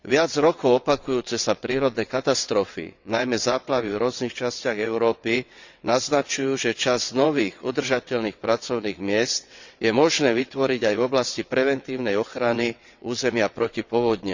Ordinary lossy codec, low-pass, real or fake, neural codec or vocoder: Opus, 64 kbps; 7.2 kHz; fake; vocoder, 22.05 kHz, 80 mel bands, WaveNeXt